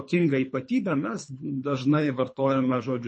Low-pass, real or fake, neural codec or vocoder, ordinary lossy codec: 9.9 kHz; fake; codec, 24 kHz, 3 kbps, HILCodec; MP3, 32 kbps